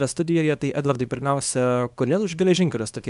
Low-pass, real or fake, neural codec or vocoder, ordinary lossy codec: 10.8 kHz; fake; codec, 24 kHz, 0.9 kbps, WavTokenizer, small release; MP3, 96 kbps